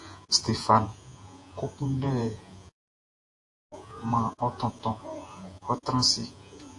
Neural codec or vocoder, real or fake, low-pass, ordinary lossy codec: vocoder, 48 kHz, 128 mel bands, Vocos; fake; 10.8 kHz; AAC, 48 kbps